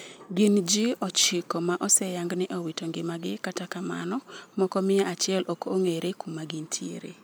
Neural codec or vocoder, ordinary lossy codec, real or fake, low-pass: none; none; real; none